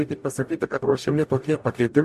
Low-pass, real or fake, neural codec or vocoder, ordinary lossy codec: 14.4 kHz; fake; codec, 44.1 kHz, 0.9 kbps, DAC; MP3, 64 kbps